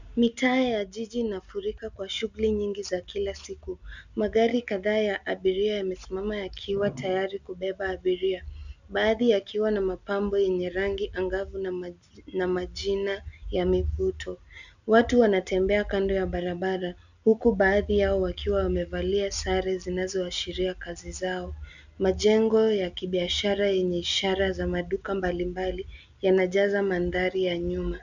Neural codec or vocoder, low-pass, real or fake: none; 7.2 kHz; real